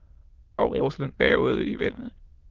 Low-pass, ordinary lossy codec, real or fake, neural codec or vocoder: 7.2 kHz; Opus, 16 kbps; fake; autoencoder, 22.05 kHz, a latent of 192 numbers a frame, VITS, trained on many speakers